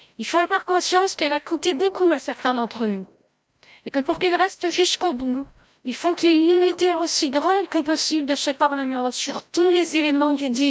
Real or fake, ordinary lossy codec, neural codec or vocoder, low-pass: fake; none; codec, 16 kHz, 0.5 kbps, FreqCodec, larger model; none